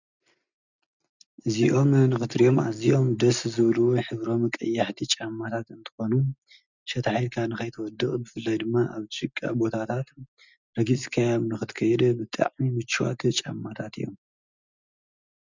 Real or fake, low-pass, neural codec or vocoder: real; 7.2 kHz; none